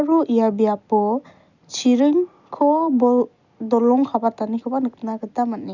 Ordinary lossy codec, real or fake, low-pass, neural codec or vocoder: none; real; 7.2 kHz; none